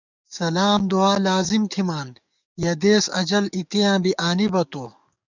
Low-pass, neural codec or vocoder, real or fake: 7.2 kHz; codec, 44.1 kHz, 7.8 kbps, DAC; fake